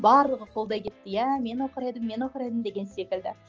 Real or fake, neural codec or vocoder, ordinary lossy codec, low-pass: real; none; Opus, 24 kbps; 7.2 kHz